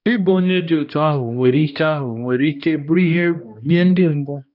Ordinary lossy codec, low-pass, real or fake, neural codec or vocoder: none; 5.4 kHz; fake; codec, 16 kHz, 1 kbps, X-Codec, HuBERT features, trained on balanced general audio